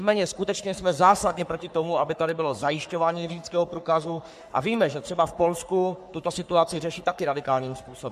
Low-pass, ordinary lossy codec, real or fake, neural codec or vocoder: 14.4 kHz; MP3, 96 kbps; fake; codec, 44.1 kHz, 3.4 kbps, Pupu-Codec